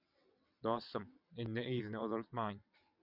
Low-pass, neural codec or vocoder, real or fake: 5.4 kHz; vocoder, 22.05 kHz, 80 mel bands, WaveNeXt; fake